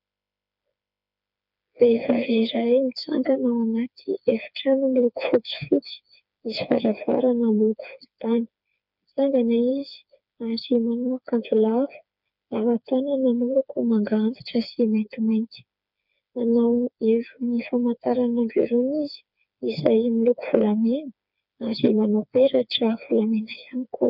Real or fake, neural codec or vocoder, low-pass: fake; codec, 16 kHz, 4 kbps, FreqCodec, smaller model; 5.4 kHz